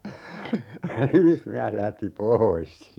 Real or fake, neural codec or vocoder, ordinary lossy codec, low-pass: fake; codec, 44.1 kHz, 7.8 kbps, DAC; none; 19.8 kHz